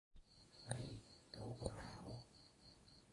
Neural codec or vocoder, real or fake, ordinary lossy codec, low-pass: codec, 24 kHz, 0.9 kbps, WavTokenizer, medium speech release version 1; fake; AAC, 32 kbps; 10.8 kHz